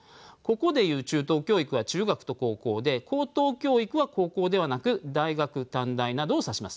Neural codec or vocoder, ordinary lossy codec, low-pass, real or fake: none; none; none; real